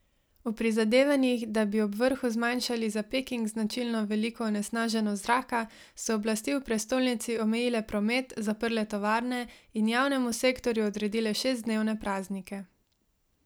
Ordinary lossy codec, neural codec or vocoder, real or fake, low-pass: none; none; real; none